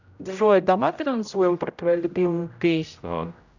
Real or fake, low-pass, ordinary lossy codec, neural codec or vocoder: fake; 7.2 kHz; none; codec, 16 kHz, 0.5 kbps, X-Codec, HuBERT features, trained on general audio